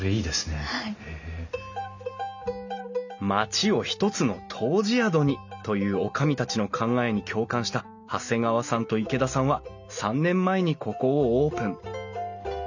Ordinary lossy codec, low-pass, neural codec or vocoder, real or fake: none; 7.2 kHz; none; real